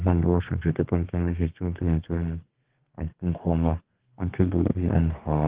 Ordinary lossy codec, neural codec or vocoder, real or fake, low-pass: Opus, 16 kbps; codec, 32 kHz, 1.9 kbps, SNAC; fake; 3.6 kHz